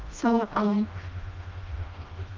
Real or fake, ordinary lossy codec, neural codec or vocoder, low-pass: fake; Opus, 24 kbps; codec, 16 kHz, 1 kbps, FreqCodec, smaller model; 7.2 kHz